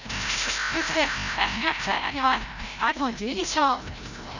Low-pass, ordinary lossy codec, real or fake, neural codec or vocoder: 7.2 kHz; none; fake; codec, 16 kHz, 0.5 kbps, FreqCodec, larger model